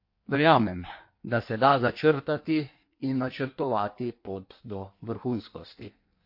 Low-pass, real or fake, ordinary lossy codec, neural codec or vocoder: 5.4 kHz; fake; MP3, 32 kbps; codec, 16 kHz in and 24 kHz out, 1.1 kbps, FireRedTTS-2 codec